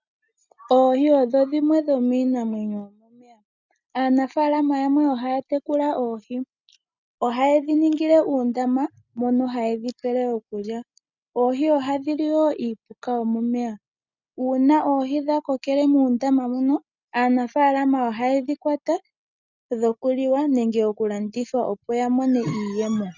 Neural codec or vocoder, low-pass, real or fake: none; 7.2 kHz; real